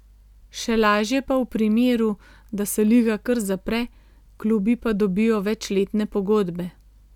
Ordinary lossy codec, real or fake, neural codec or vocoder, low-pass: none; real; none; 19.8 kHz